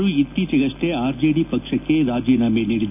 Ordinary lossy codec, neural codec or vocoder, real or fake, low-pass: none; none; real; 3.6 kHz